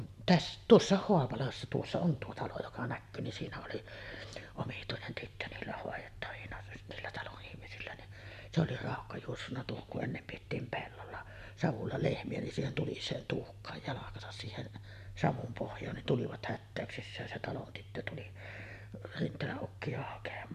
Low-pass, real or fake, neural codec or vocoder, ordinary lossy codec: 14.4 kHz; fake; vocoder, 44.1 kHz, 128 mel bands every 512 samples, BigVGAN v2; none